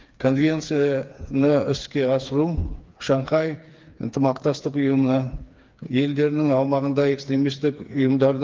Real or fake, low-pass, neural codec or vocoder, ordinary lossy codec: fake; 7.2 kHz; codec, 16 kHz, 4 kbps, FreqCodec, smaller model; Opus, 32 kbps